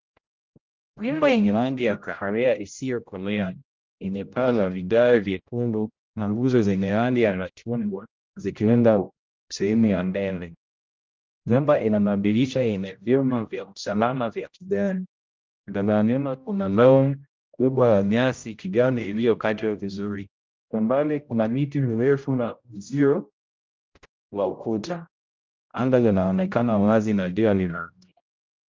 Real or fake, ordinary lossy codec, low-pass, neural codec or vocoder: fake; Opus, 24 kbps; 7.2 kHz; codec, 16 kHz, 0.5 kbps, X-Codec, HuBERT features, trained on general audio